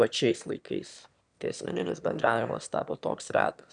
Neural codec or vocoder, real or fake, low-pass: autoencoder, 22.05 kHz, a latent of 192 numbers a frame, VITS, trained on one speaker; fake; 9.9 kHz